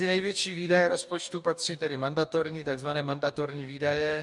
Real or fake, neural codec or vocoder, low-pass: fake; codec, 44.1 kHz, 2.6 kbps, DAC; 10.8 kHz